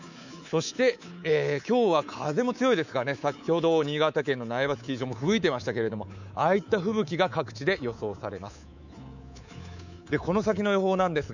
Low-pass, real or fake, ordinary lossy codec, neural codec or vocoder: 7.2 kHz; fake; none; codec, 24 kHz, 3.1 kbps, DualCodec